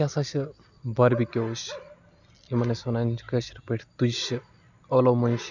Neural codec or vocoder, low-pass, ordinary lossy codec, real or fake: none; 7.2 kHz; none; real